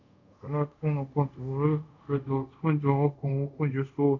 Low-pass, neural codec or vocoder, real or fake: 7.2 kHz; codec, 24 kHz, 0.5 kbps, DualCodec; fake